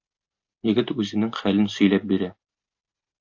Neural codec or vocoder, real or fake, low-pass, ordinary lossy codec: none; real; 7.2 kHz; AAC, 48 kbps